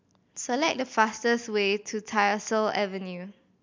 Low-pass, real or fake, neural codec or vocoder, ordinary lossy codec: 7.2 kHz; real; none; MP3, 64 kbps